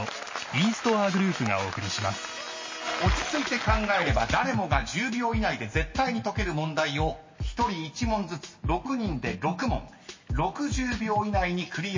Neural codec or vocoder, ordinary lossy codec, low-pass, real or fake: none; MP3, 32 kbps; 7.2 kHz; real